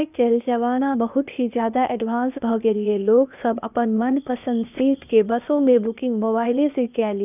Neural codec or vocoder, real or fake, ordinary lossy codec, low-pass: codec, 16 kHz, 0.8 kbps, ZipCodec; fake; none; 3.6 kHz